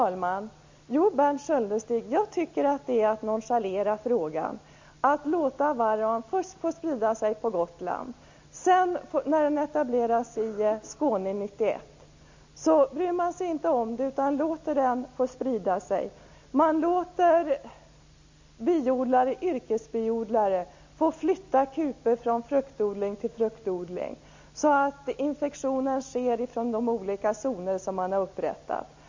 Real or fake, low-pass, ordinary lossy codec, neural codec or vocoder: real; 7.2 kHz; MP3, 64 kbps; none